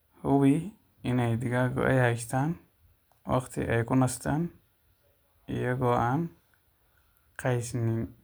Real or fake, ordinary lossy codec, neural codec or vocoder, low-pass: real; none; none; none